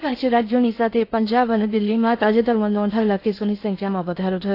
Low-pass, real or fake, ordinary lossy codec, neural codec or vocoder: 5.4 kHz; fake; AAC, 32 kbps; codec, 16 kHz in and 24 kHz out, 0.6 kbps, FocalCodec, streaming, 4096 codes